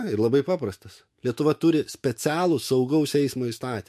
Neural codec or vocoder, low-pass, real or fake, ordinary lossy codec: autoencoder, 48 kHz, 128 numbers a frame, DAC-VAE, trained on Japanese speech; 14.4 kHz; fake; MP3, 64 kbps